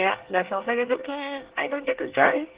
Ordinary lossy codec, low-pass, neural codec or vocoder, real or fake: Opus, 16 kbps; 3.6 kHz; codec, 24 kHz, 1 kbps, SNAC; fake